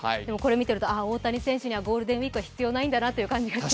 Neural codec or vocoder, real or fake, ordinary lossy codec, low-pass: none; real; none; none